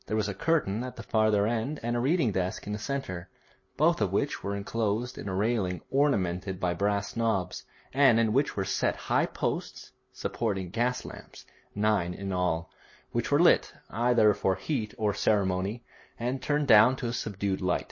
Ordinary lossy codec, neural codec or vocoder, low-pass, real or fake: MP3, 32 kbps; none; 7.2 kHz; real